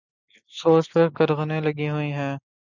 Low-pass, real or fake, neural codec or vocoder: 7.2 kHz; real; none